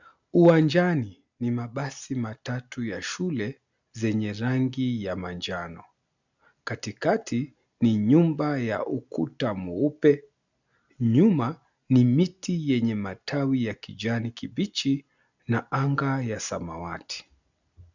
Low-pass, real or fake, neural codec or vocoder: 7.2 kHz; real; none